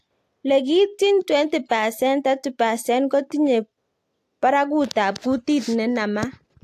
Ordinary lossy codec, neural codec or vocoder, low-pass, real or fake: AAC, 64 kbps; none; 14.4 kHz; real